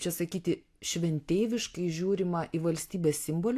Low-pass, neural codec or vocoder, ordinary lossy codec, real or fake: 14.4 kHz; none; AAC, 64 kbps; real